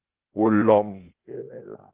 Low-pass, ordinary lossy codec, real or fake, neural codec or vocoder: 3.6 kHz; Opus, 16 kbps; fake; codec, 16 kHz, 0.8 kbps, ZipCodec